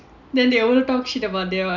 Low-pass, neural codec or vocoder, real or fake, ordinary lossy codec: 7.2 kHz; none; real; none